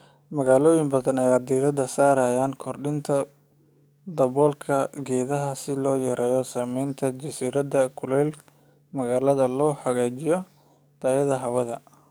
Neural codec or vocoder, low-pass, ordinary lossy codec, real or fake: codec, 44.1 kHz, 7.8 kbps, DAC; none; none; fake